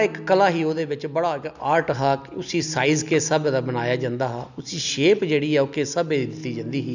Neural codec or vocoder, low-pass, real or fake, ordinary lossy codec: none; 7.2 kHz; real; none